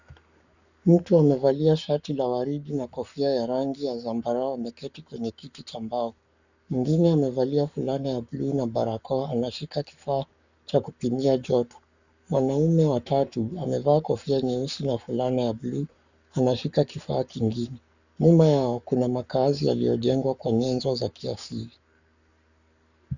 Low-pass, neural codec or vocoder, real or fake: 7.2 kHz; codec, 44.1 kHz, 7.8 kbps, Pupu-Codec; fake